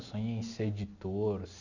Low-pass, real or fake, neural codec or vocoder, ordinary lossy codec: 7.2 kHz; real; none; none